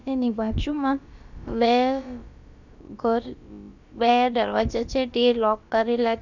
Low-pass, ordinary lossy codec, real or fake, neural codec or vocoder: 7.2 kHz; none; fake; codec, 16 kHz, about 1 kbps, DyCAST, with the encoder's durations